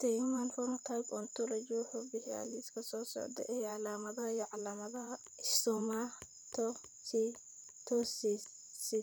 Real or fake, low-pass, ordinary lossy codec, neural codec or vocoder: fake; none; none; vocoder, 44.1 kHz, 128 mel bands every 512 samples, BigVGAN v2